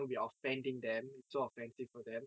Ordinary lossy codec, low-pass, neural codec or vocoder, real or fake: none; none; none; real